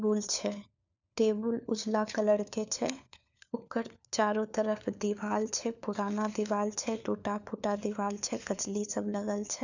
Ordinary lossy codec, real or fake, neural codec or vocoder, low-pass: none; fake; codec, 16 kHz, 4 kbps, FunCodec, trained on LibriTTS, 50 frames a second; 7.2 kHz